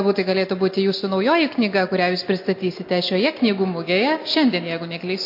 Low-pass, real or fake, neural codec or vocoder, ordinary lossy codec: 5.4 kHz; real; none; MP3, 32 kbps